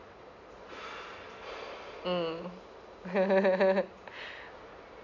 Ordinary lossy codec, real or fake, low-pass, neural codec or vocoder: none; real; 7.2 kHz; none